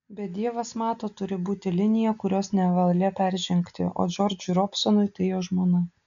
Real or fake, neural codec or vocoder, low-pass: real; none; 7.2 kHz